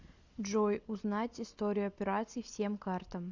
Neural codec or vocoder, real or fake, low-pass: none; real; 7.2 kHz